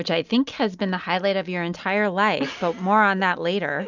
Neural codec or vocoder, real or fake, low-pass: none; real; 7.2 kHz